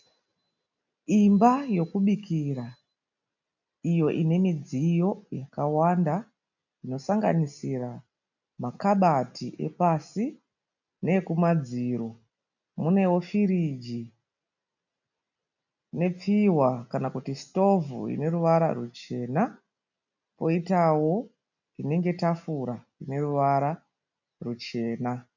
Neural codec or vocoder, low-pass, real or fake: none; 7.2 kHz; real